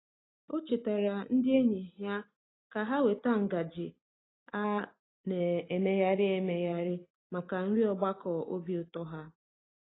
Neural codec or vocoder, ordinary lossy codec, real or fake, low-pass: none; AAC, 16 kbps; real; 7.2 kHz